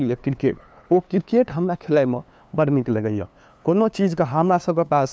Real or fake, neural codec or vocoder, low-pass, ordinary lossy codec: fake; codec, 16 kHz, 2 kbps, FunCodec, trained on LibriTTS, 25 frames a second; none; none